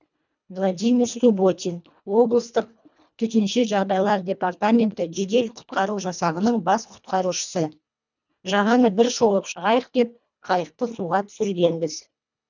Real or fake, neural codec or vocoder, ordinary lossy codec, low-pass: fake; codec, 24 kHz, 1.5 kbps, HILCodec; none; 7.2 kHz